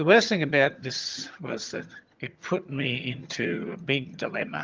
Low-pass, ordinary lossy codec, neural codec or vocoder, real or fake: 7.2 kHz; Opus, 32 kbps; vocoder, 22.05 kHz, 80 mel bands, HiFi-GAN; fake